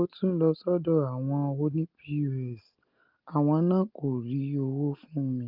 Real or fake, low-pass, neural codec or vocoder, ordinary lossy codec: real; 5.4 kHz; none; Opus, 32 kbps